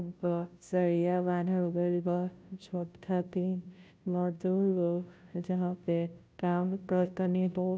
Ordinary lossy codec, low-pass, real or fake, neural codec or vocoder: none; none; fake; codec, 16 kHz, 0.5 kbps, FunCodec, trained on Chinese and English, 25 frames a second